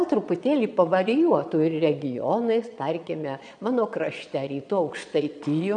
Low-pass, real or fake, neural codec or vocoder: 9.9 kHz; fake; vocoder, 22.05 kHz, 80 mel bands, Vocos